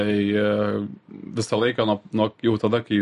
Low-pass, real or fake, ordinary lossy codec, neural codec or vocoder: 14.4 kHz; real; MP3, 48 kbps; none